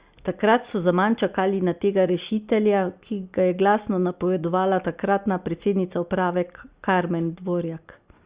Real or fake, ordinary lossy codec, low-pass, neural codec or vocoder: real; Opus, 64 kbps; 3.6 kHz; none